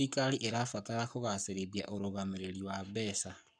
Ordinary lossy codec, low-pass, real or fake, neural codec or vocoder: none; 9.9 kHz; fake; codec, 44.1 kHz, 7.8 kbps, Pupu-Codec